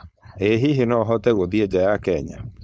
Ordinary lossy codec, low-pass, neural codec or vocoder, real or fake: none; none; codec, 16 kHz, 4.8 kbps, FACodec; fake